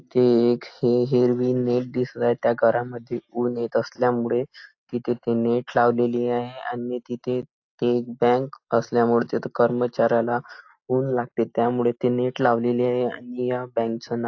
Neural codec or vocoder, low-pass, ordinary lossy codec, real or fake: none; 7.2 kHz; AAC, 48 kbps; real